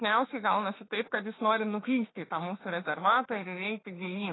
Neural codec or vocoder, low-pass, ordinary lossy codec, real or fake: autoencoder, 48 kHz, 32 numbers a frame, DAC-VAE, trained on Japanese speech; 7.2 kHz; AAC, 16 kbps; fake